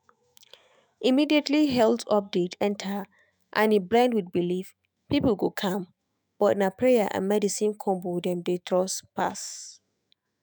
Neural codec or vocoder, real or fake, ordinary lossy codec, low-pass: autoencoder, 48 kHz, 128 numbers a frame, DAC-VAE, trained on Japanese speech; fake; none; none